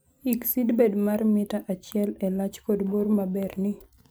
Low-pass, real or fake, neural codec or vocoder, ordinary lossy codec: none; real; none; none